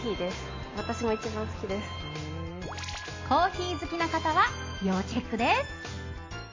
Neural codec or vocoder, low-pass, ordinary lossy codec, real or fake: none; 7.2 kHz; MP3, 32 kbps; real